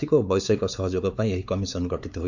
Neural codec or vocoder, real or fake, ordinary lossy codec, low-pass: codec, 16 kHz, 4 kbps, X-Codec, WavLM features, trained on Multilingual LibriSpeech; fake; none; 7.2 kHz